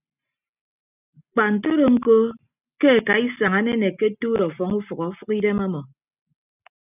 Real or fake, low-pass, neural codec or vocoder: real; 3.6 kHz; none